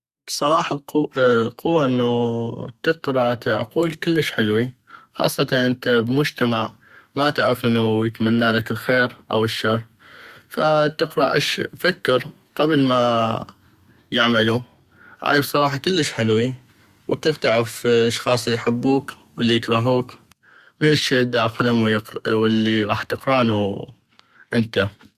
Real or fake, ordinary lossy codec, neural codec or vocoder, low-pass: fake; Opus, 64 kbps; codec, 32 kHz, 1.9 kbps, SNAC; 14.4 kHz